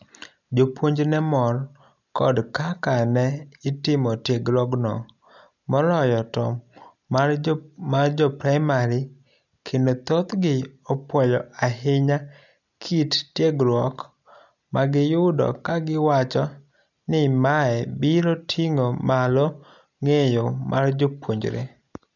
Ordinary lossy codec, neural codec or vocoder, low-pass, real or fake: none; none; 7.2 kHz; real